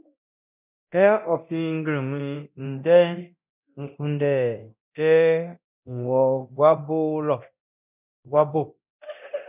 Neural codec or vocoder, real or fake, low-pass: codec, 24 kHz, 0.9 kbps, DualCodec; fake; 3.6 kHz